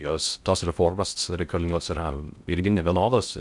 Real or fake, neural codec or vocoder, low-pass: fake; codec, 16 kHz in and 24 kHz out, 0.6 kbps, FocalCodec, streaming, 2048 codes; 10.8 kHz